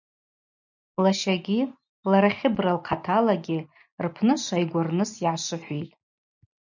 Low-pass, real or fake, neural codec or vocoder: 7.2 kHz; real; none